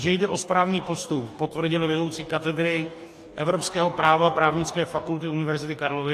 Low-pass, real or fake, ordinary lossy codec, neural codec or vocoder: 14.4 kHz; fake; AAC, 64 kbps; codec, 44.1 kHz, 2.6 kbps, DAC